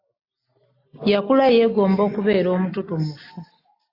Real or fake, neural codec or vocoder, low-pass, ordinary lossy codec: real; none; 5.4 kHz; AAC, 32 kbps